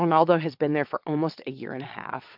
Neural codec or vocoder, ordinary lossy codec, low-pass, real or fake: vocoder, 22.05 kHz, 80 mel bands, WaveNeXt; MP3, 48 kbps; 5.4 kHz; fake